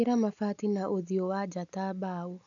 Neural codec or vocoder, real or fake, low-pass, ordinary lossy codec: none; real; 7.2 kHz; none